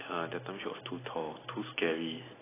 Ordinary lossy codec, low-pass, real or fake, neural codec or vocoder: AAC, 16 kbps; 3.6 kHz; fake; vocoder, 44.1 kHz, 128 mel bands every 256 samples, BigVGAN v2